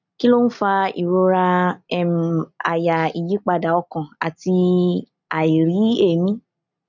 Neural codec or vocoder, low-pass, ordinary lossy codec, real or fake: none; 7.2 kHz; none; real